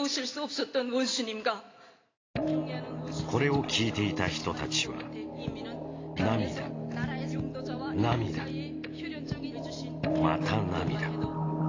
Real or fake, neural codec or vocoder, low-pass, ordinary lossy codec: real; none; 7.2 kHz; AAC, 32 kbps